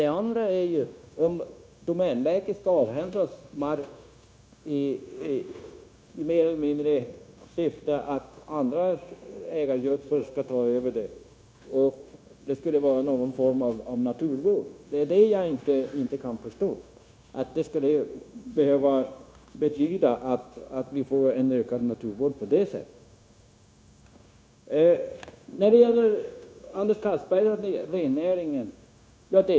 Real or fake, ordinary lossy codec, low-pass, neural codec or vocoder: fake; none; none; codec, 16 kHz, 0.9 kbps, LongCat-Audio-Codec